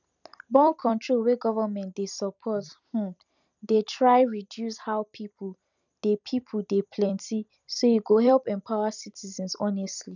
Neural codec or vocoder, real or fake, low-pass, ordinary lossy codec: vocoder, 44.1 kHz, 128 mel bands every 256 samples, BigVGAN v2; fake; 7.2 kHz; none